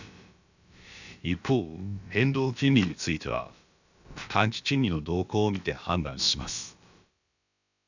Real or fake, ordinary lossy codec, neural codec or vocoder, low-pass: fake; none; codec, 16 kHz, about 1 kbps, DyCAST, with the encoder's durations; 7.2 kHz